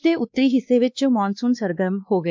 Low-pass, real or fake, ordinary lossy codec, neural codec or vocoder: 7.2 kHz; fake; MP3, 64 kbps; codec, 16 kHz, 2 kbps, X-Codec, WavLM features, trained on Multilingual LibriSpeech